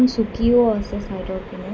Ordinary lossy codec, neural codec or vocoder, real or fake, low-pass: none; none; real; none